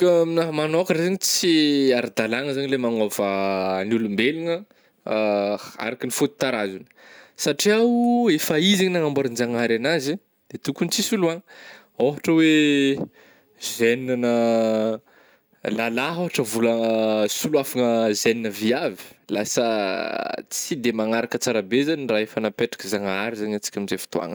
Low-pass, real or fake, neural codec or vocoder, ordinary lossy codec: none; real; none; none